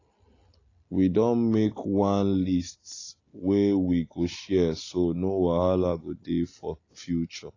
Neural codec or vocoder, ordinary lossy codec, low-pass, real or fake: none; AAC, 32 kbps; 7.2 kHz; real